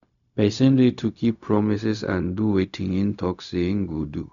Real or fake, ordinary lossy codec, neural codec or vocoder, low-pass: fake; none; codec, 16 kHz, 0.4 kbps, LongCat-Audio-Codec; 7.2 kHz